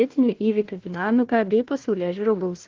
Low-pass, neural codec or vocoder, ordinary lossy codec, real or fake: 7.2 kHz; codec, 16 kHz, 1 kbps, FunCodec, trained on Chinese and English, 50 frames a second; Opus, 16 kbps; fake